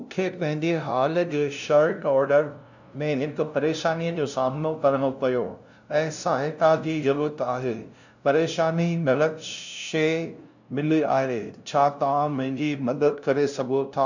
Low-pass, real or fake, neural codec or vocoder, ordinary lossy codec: 7.2 kHz; fake; codec, 16 kHz, 0.5 kbps, FunCodec, trained on LibriTTS, 25 frames a second; none